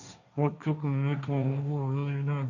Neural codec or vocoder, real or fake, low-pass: codec, 16 kHz, 1.1 kbps, Voila-Tokenizer; fake; 7.2 kHz